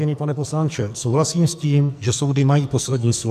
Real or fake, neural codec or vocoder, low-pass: fake; codec, 44.1 kHz, 2.6 kbps, SNAC; 14.4 kHz